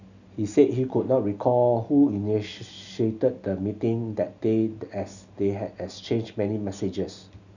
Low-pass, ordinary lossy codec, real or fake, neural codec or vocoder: 7.2 kHz; none; real; none